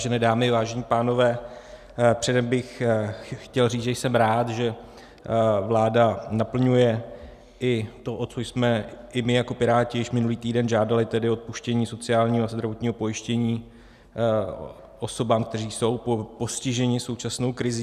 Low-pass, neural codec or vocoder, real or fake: 14.4 kHz; none; real